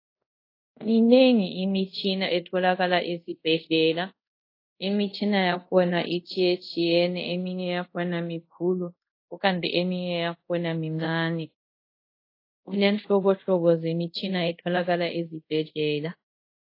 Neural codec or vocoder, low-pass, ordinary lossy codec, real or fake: codec, 24 kHz, 0.5 kbps, DualCodec; 5.4 kHz; AAC, 32 kbps; fake